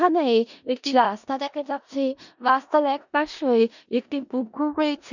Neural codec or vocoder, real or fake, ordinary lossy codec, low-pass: codec, 16 kHz in and 24 kHz out, 0.4 kbps, LongCat-Audio-Codec, four codebook decoder; fake; none; 7.2 kHz